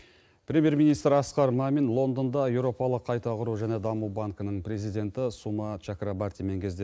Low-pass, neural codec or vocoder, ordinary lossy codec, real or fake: none; none; none; real